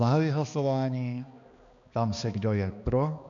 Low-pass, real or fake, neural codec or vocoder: 7.2 kHz; fake; codec, 16 kHz, 2 kbps, X-Codec, HuBERT features, trained on balanced general audio